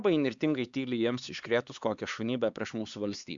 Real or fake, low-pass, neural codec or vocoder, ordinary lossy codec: fake; 7.2 kHz; codec, 16 kHz, 4 kbps, X-Codec, HuBERT features, trained on LibriSpeech; Opus, 64 kbps